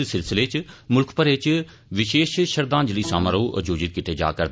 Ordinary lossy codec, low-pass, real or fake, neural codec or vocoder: none; none; real; none